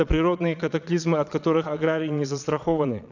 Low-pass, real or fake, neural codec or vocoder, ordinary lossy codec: 7.2 kHz; fake; vocoder, 22.05 kHz, 80 mel bands, Vocos; none